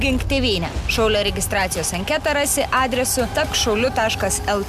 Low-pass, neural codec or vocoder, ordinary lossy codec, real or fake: 14.4 kHz; none; MP3, 96 kbps; real